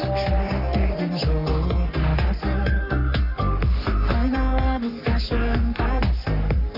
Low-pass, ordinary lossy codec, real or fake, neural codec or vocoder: 5.4 kHz; none; fake; codec, 44.1 kHz, 3.4 kbps, Pupu-Codec